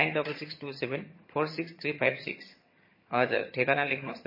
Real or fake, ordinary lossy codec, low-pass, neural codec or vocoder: fake; MP3, 24 kbps; 5.4 kHz; vocoder, 22.05 kHz, 80 mel bands, HiFi-GAN